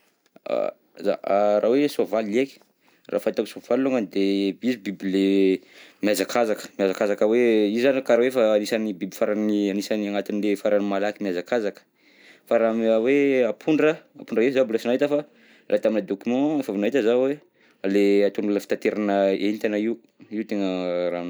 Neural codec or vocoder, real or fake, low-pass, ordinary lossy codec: none; real; none; none